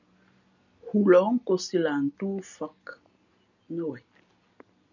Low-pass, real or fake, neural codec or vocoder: 7.2 kHz; real; none